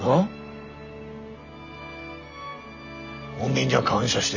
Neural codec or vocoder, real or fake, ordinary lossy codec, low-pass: none; real; none; 7.2 kHz